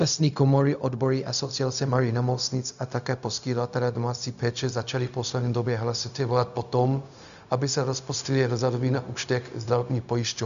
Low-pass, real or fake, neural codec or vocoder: 7.2 kHz; fake; codec, 16 kHz, 0.4 kbps, LongCat-Audio-Codec